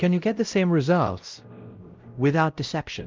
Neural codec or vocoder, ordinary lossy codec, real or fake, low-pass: codec, 16 kHz, 0.5 kbps, X-Codec, WavLM features, trained on Multilingual LibriSpeech; Opus, 24 kbps; fake; 7.2 kHz